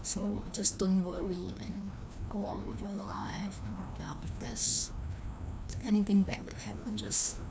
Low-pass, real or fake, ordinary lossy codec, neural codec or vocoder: none; fake; none; codec, 16 kHz, 1 kbps, FreqCodec, larger model